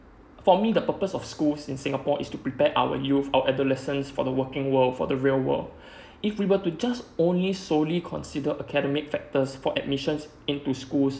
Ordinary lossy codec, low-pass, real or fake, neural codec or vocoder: none; none; real; none